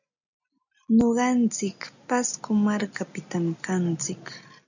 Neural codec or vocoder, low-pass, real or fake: none; 7.2 kHz; real